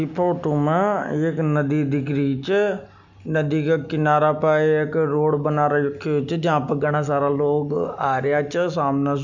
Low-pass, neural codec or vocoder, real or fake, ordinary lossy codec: 7.2 kHz; none; real; none